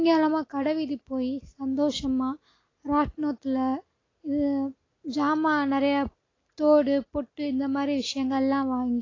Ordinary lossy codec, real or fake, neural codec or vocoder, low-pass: AAC, 32 kbps; real; none; 7.2 kHz